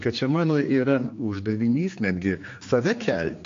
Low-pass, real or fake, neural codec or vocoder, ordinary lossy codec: 7.2 kHz; fake; codec, 16 kHz, 2 kbps, X-Codec, HuBERT features, trained on general audio; AAC, 48 kbps